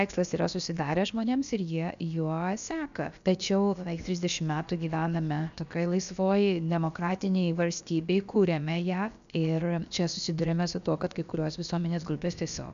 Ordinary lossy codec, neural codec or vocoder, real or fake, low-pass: AAC, 96 kbps; codec, 16 kHz, about 1 kbps, DyCAST, with the encoder's durations; fake; 7.2 kHz